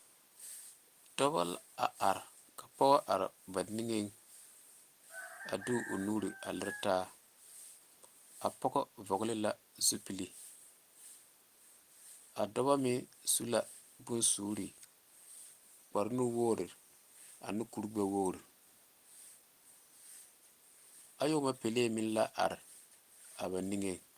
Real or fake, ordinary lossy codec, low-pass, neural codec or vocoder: real; Opus, 24 kbps; 14.4 kHz; none